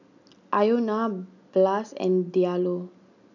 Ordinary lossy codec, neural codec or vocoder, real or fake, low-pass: none; none; real; 7.2 kHz